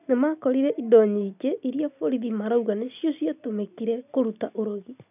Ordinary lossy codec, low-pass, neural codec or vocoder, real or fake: none; 3.6 kHz; none; real